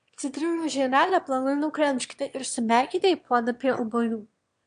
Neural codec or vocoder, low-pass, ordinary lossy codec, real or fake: autoencoder, 22.05 kHz, a latent of 192 numbers a frame, VITS, trained on one speaker; 9.9 kHz; MP3, 64 kbps; fake